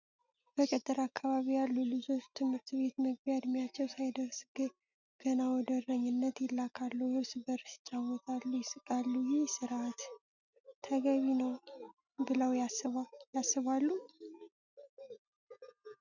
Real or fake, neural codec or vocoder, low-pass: real; none; 7.2 kHz